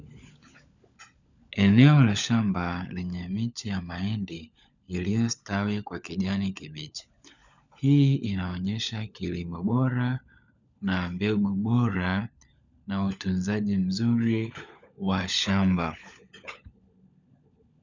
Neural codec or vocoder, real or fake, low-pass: codec, 16 kHz, 16 kbps, FunCodec, trained on LibriTTS, 50 frames a second; fake; 7.2 kHz